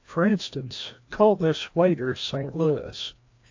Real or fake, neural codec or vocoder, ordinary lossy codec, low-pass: fake; codec, 16 kHz, 1 kbps, FreqCodec, larger model; AAC, 48 kbps; 7.2 kHz